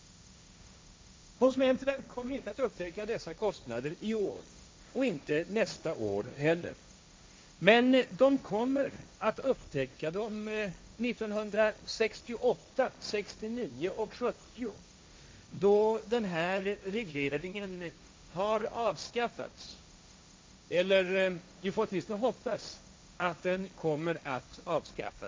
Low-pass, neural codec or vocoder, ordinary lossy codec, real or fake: none; codec, 16 kHz, 1.1 kbps, Voila-Tokenizer; none; fake